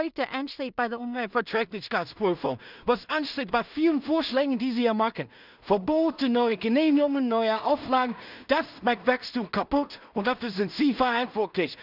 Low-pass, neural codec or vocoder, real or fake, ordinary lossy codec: 5.4 kHz; codec, 16 kHz in and 24 kHz out, 0.4 kbps, LongCat-Audio-Codec, two codebook decoder; fake; none